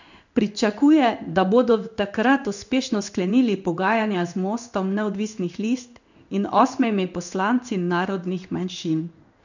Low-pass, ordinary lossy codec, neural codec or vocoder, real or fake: 7.2 kHz; none; codec, 16 kHz in and 24 kHz out, 1 kbps, XY-Tokenizer; fake